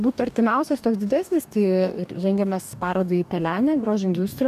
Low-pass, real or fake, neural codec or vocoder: 14.4 kHz; fake; codec, 44.1 kHz, 2.6 kbps, DAC